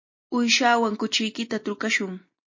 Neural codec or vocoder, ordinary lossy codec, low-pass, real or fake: none; MP3, 32 kbps; 7.2 kHz; real